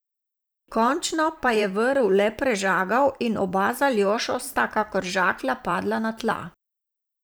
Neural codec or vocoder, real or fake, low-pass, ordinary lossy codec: vocoder, 44.1 kHz, 128 mel bands every 512 samples, BigVGAN v2; fake; none; none